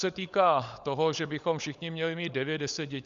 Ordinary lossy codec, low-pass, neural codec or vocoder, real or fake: Opus, 64 kbps; 7.2 kHz; codec, 16 kHz, 16 kbps, FunCodec, trained on LibriTTS, 50 frames a second; fake